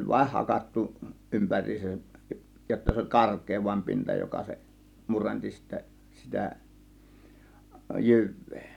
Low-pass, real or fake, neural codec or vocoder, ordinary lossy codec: 19.8 kHz; real; none; none